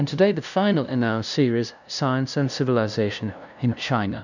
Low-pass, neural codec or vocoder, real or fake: 7.2 kHz; codec, 16 kHz, 0.5 kbps, FunCodec, trained on LibriTTS, 25 frames a second; fake